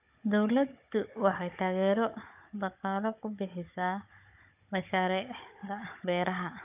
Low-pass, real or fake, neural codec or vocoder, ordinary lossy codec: 3.6 kHz; fake; codec, 16 kHz, 16 kbps, FunCodec, trained on Chinese and English, 50 frames a second; AAC, 32 kbps